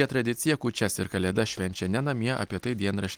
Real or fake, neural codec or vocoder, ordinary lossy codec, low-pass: real; none; Opus, 24 kbps; 19.8 kHz